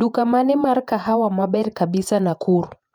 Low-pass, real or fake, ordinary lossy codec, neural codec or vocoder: 19.8 kHz; fake; none; vocoder, 48 kHz, 128 mel bands, Vocos